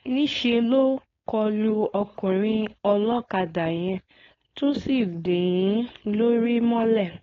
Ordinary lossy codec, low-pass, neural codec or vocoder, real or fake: AAC, 32 kbps; 7.2 kHz; codec, 16 kHz, 4.8 kbps, FACodec; fake